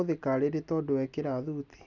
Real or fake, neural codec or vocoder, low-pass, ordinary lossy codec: real; none; 7.2 kHz; none